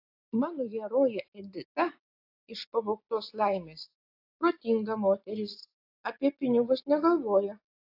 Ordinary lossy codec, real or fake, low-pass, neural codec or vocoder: AAC, 32 kbps; real; 5.4 kHz; none